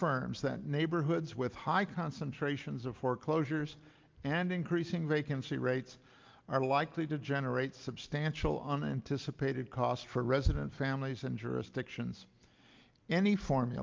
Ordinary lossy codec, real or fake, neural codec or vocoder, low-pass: Opus, 32 kbps; real; none; 7.2 kHz